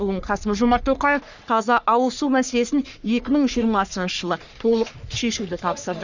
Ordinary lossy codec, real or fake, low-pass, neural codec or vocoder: none; fake; 7.2 kHz; codec, 44.1 kHz, 3.4 kbps, Pupu-Codec